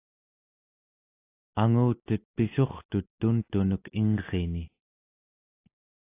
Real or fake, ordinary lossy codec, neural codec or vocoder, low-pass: real; AAC, 24 kbps; none; 3.6 kHz